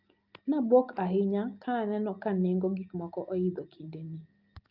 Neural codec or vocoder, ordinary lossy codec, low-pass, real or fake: none; Opus, 24 kbps; 5.4 kHz; real